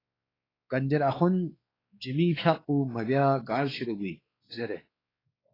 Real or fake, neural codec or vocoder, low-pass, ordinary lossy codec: fake; codec, 16 kHz, 4 kbps, X-Codec, WavLM features, trained on Multilingual LibriSpeech; 5.4 kHz; AAC, 24 kbps